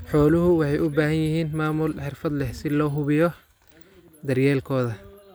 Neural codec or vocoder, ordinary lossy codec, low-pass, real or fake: none; none; none; real